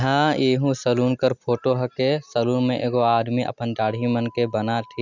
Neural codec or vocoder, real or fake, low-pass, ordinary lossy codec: none; real; 7.2 kHz; none